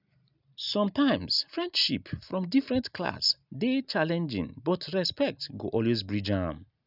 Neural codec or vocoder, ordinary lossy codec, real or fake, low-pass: none; none; real; 5.4 kHz